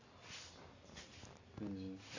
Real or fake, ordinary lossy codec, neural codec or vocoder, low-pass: real; AAC, 48 kbps; none; 7.2 kHz